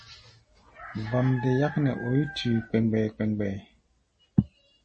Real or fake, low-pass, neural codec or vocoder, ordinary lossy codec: real; 10.8 kHz; none; MP3, 32 kbps